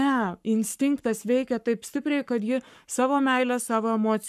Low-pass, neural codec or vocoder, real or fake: 14.4 kHz; codec, 44.1 kHz, 7.8 kbps, Pupu-Codec; fake